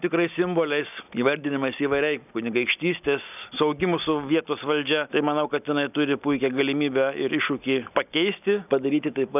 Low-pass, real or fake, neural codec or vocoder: 3.6 kHz; real; none